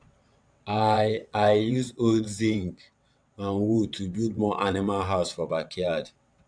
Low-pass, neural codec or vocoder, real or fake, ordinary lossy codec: 9.9 kHz; vocoder, 22.05 kHz, 80 mel bands, WaveNeXt; fake; none